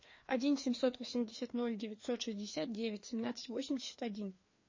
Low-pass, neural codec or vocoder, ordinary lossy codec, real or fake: 7.2 kHz; codec, 16 kHz, 2 kbps, FreqCodec, larger model; MP3, 32 kbps; fake